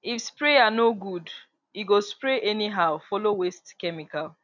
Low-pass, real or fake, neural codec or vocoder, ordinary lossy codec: 7.2 kHz; real; none; none